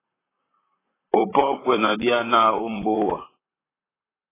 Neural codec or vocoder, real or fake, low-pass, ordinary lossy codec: vocoder, 44.1 kHz, 128 mel bands, Pupu-Vocoder; fake; 3.6 kHz; AAC, 16 kbps